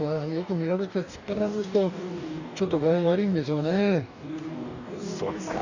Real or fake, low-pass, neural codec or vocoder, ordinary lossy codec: fake; 7.2 kHz; codec, 44.1 kHz, 2.6 kbps, DAC; none